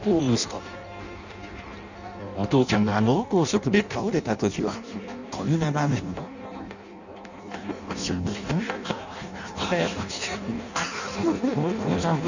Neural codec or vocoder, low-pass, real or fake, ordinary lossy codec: codec, 16 kHz in and 24 kHz out, 0.6 kbps, FireRedTTS-2 codec; 7.2 kHz; fake; none